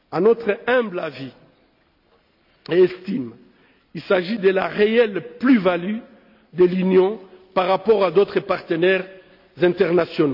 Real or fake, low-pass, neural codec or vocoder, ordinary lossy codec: real; 5.4 kHz; none; none